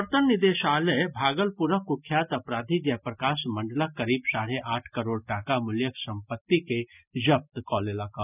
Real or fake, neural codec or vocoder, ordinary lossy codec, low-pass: real; none; none; 3.6 kHz